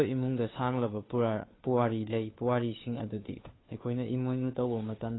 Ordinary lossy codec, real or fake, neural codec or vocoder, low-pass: AAC, 16 kbps; fake; codec, 16 kHz, 2 kbps, FunCodec, trained on Chinese and English, 25 frames a second; 7.2 kHz